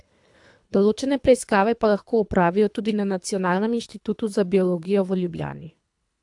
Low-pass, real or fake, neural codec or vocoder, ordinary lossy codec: 10.8 kHz; fake; codec, 24 kHz, 3 kbps, HILCodec; AAC, 64 kbps